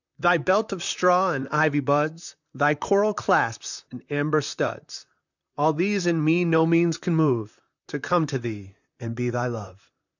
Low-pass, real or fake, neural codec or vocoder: 7.2 kHz; fake; vocoder, 44.1 kHz, 128 mel bands, Pupu-Vocoder